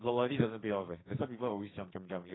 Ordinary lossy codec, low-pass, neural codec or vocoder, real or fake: AAC, 16 kbps; 7.2 kHz; codec, 44.1 kHz, 2.6 kbps, SNAC; fake